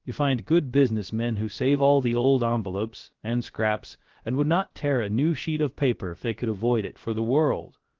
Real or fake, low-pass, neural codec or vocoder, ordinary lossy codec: fake; 7.2 kHz; codec, 16 kHz, about 1 kbps, DyCAST, with the encoder's durations; Opus, 16 kbps